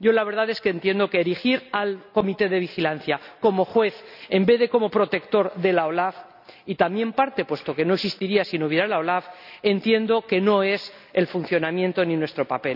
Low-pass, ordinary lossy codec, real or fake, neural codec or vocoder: 5.4 kHz; none; real; none